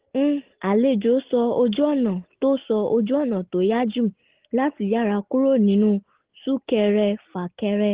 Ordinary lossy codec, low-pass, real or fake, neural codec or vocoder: Opus, 16 kbps; 3.6 kHz; real; none